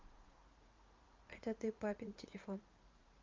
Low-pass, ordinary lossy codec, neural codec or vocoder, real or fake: 7.2 kHz; Opus, 24 kbps; vocoder, 44.1 kHz, 80 mel bands, Vocos; fake